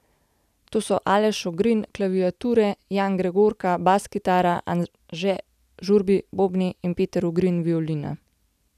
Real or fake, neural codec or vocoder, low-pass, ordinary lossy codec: real; none; 14.4 kHz; none